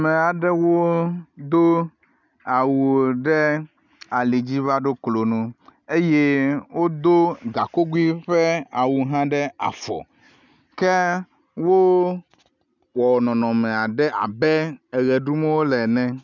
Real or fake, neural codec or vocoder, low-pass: real; none; 7.2 kHz